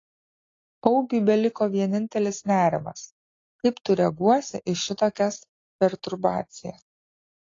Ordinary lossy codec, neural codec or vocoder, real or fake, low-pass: AAC, 32 kbps; none; real; 7.2 kHz